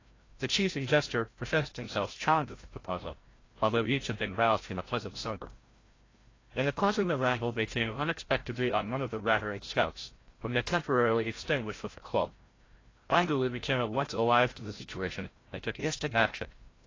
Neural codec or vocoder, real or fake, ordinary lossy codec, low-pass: codec, 16 kHz, 0.5 kbps, FreqCodec, larger model; fake; AAC, 32 kbps; 7.2 kHz